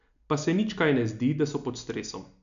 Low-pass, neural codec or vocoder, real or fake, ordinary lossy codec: 7.2 kHz; none; real; none